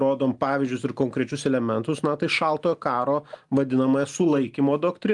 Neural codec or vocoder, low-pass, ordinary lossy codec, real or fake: none; 10.8 kHz; Opus, 32 kbps; real